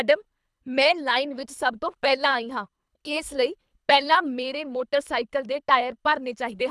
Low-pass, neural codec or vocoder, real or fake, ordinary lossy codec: none; codec, 24 kHz, 3 kbps, HILCodec; fake; none